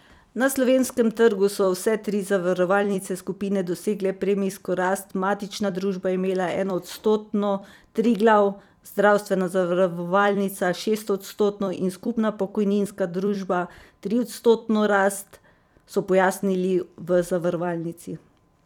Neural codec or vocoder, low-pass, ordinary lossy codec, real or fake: vocoder, 44.1 kHz, 128 mel bands every 256 samples, BigVGAN v2; 19.8 kHz; none; fake